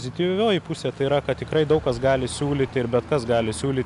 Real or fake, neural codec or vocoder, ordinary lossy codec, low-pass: real; none; AAC, 96 kbps; 10.8 kHz